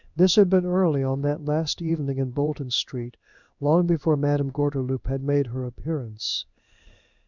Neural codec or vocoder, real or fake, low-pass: codec, 16 kHz in and 24 kHz out, 1 kbps, XY-Tokenizer; fake; 7.2 kHz